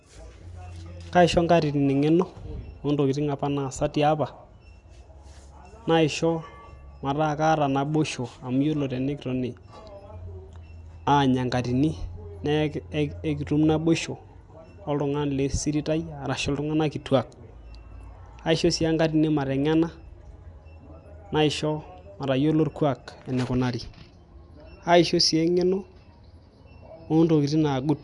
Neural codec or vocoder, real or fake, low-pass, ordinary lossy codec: none; real; 10.8 kHz; none